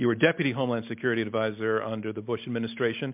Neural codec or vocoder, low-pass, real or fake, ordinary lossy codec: none; 3.6 kHz; real; MP3, 32 kbps